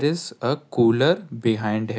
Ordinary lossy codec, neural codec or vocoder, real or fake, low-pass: none; none; real; none